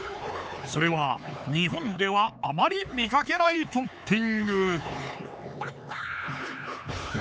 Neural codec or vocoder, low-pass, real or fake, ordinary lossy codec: codec, 16 kHz, 4 kbps, X-Codec, HuBERT features, trained on LibriSpeech; none; fake; none